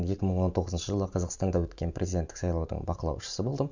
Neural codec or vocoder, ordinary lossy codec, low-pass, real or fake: vocoder, 44.1 kHz, 128 mel bands every 512 samples, BigVGAN v2; none; 7.2 kHz; fake